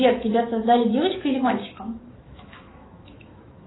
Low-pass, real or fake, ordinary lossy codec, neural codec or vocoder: 7.2 kHz; fake; AAC, 16 kbps; autoencoder, 48 kHz, 128 numbers a frame, DAC-VAE, trained on Japanese speech